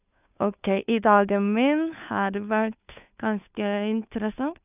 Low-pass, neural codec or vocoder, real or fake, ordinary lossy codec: 3.6 kHz; codec, 16 kHz, 2 kbps, FunCodec, trained on Chinese and English, 25 frames a second; fake; none